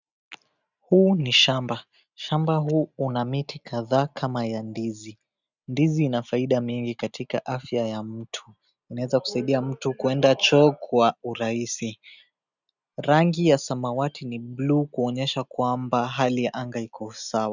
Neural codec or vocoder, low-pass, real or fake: none; 7.2 kHz; real